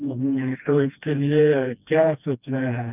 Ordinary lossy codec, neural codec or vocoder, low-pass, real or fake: none; codec, 16 kHz, 1 kbps, FreqCodec, smaller model; 3.6 kHz; fake